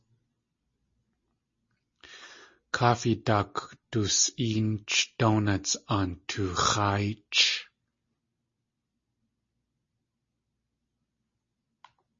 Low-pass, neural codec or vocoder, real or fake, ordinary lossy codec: 7.2 kHz; none; real; MP3, 32 kbps